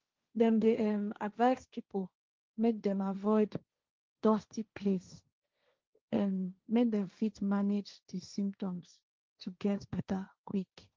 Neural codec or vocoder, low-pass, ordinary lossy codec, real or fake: codec, 16 kHz, 1.1 kbps, Voila-Tokenizer; 7.2 kHz; Opus, 32 kbps; fake